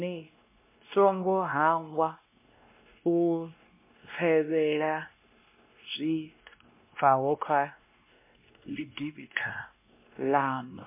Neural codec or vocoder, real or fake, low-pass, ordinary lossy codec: codec, 16 kHz, 1 kbps, X-Codec, WavLM features, trained on Multilingual LibriSpeech; fake; 3.6 kHz; MP3, 32 kbps